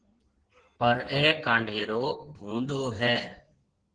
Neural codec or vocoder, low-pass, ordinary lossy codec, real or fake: codec, 16 kHz in and 24 kHz out, 1.1 kbps, FireRedTTS-2 codec; 9.9 kHz; Opus, 16 kbps; fake